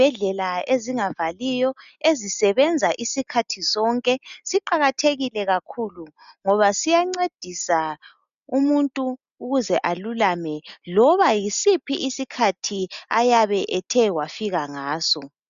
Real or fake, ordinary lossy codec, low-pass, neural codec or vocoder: real; MP3, 96 kbps; 7.2 kHz; none